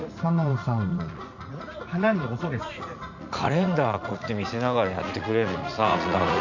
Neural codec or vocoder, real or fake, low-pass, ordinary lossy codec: vocoder, 44.1 kHz, 80 mel bands, Vocos; fake; 7.2 kHz; none